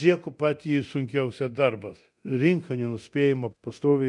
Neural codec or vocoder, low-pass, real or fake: codec, 24 kHz, 0.9 kbps, DualCodec; 10.8 kHz; fake